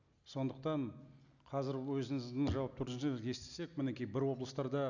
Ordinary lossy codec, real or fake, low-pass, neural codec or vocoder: none; real; 7.2 kHz; none